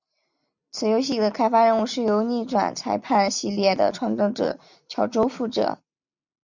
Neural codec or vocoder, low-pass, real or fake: none; 7.2 kHz; real